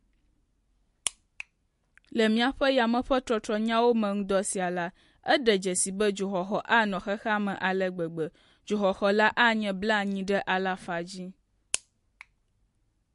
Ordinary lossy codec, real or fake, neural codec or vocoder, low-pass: MP3, 48 kbps; real; none; 14.4 kHz